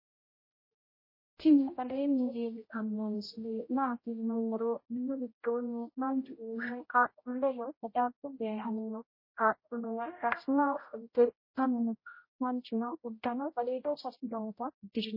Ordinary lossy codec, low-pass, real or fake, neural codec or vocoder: MP3, 24 kbps; 5.4 kHz; fake; codec, 16 kHz, 0.5 kbps, X-Codec, HuBERT features, trained on general audio